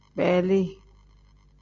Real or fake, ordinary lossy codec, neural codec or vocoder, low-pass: real; MP3, 48 kbps; none; 7.2 kHz